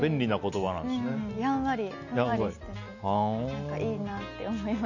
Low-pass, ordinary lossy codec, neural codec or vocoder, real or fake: 7.2 kHz; none; none; real